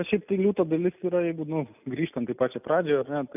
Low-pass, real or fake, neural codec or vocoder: 3.6 kHz; real; none